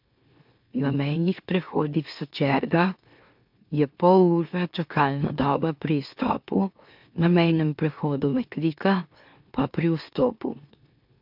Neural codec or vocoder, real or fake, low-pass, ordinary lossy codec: autoencoder, 44.1 kHz, a latent of 192 numbers a frame, MeloTTS; fake; 5.4 kHz; MP3, 48 kbps